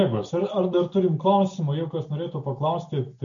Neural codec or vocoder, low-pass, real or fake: none; 7.2 kHz; real